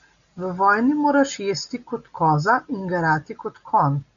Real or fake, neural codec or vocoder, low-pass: real; none; 7.2 kHz